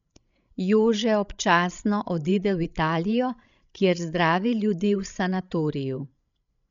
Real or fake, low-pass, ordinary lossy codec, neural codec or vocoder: fake; 7.2 kHz; none; codec, 16 kHz, 16 kbps, FreqCodec, larger model